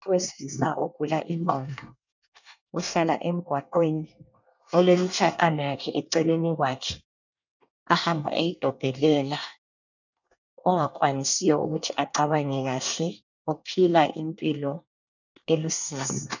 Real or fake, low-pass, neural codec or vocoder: fake; 7.2 kHz; codec, 24 kHz, 1 kbps, SNAC